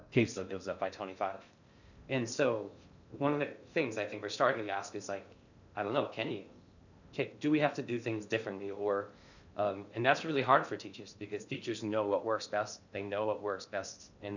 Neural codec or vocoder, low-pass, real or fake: codec, 16 kHz in and 24 kHz out, 0.6 kbps, FocalCodec, streaming, 4096 codes; 7.2 kHz; fake